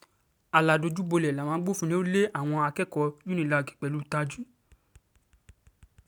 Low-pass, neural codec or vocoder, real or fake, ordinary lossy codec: none; none; real; none